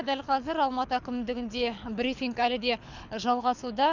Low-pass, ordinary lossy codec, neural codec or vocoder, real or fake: 7.2 kHz; none; codec, 24 kHz, 6 kbps, HILCodec; fake